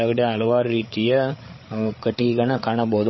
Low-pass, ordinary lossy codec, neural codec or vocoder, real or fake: 7.2 kHz; MP3, 24 kbps; codec, 16 kHz, 8 kbps, FreqCodec, larger model; fake